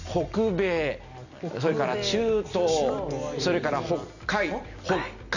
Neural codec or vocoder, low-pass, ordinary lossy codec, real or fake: none; 7.2 kHz; none; real